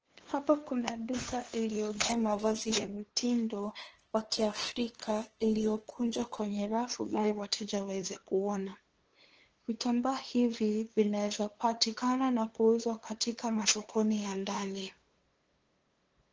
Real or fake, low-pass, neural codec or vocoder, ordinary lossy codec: fake; 7.2 kHz; codec, 16 kHz, 2 kbps, FunCodec, trained on LibriTTS, 25 frames a second; Opus, 16 kbps